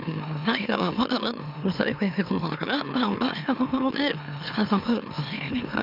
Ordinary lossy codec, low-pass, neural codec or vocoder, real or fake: none; 5.4 kHz; autoencoder, 44.1 kHz, a latent of 192 numbers a frame, MeloTTS; fake